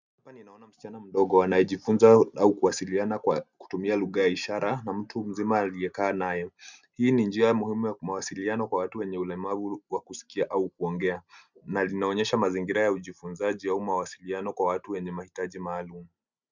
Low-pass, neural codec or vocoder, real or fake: 7.2 kHz; none; real